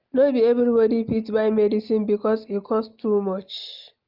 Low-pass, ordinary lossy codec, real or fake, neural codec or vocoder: 5.4 kHz; Opus, 24 kbps; real; none